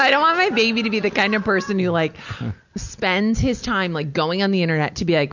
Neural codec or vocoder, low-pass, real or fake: none; 7.2 kHz; real